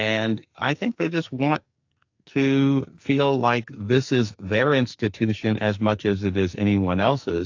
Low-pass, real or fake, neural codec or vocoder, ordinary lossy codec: 7.2 kHz; fake; codec, 44.1 kHz, 2.6 kbps, SNAC; AAC, 48 kbps